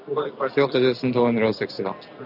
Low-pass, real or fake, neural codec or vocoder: 5.4 kHz; real; none